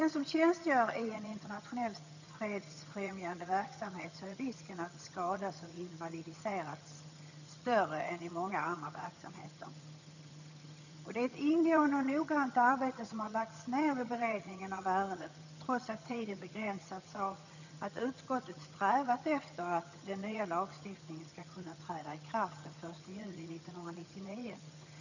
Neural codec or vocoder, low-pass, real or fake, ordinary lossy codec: vocoder, 22.05 kHz, 80 mel bands, HiFi-GAN; 7.2 kHz; fake; none